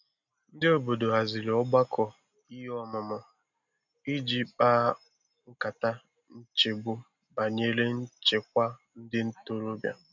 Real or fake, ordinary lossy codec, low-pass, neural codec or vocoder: real; none; 7.2 kHz; none